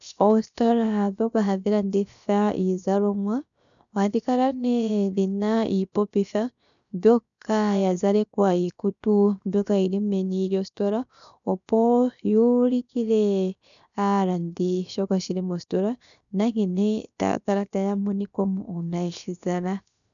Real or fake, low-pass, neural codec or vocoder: fake; 7.2 kHz; codec, 16 kHz, 0.7 kbps, FocalCodec